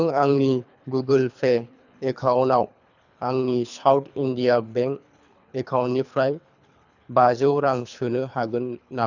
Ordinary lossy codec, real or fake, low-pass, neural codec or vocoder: none; fake; 7.2 kHz; codec, 24 kHz, 3 kbps, HILCodec